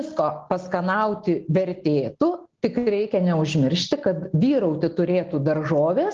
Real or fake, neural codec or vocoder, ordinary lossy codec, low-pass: real; none; Opus, 16 kbps; 7.2 kHz